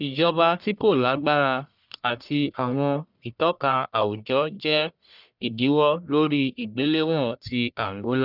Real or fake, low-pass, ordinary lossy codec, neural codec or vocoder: fake; 5.4 kHz; none; codec, 44.1 kHz, 1.7 kbps, Pupu-Codec